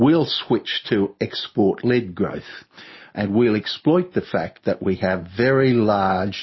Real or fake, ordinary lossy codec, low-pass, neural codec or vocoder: real; MP3, 24 kbps; 7.2 kHz; none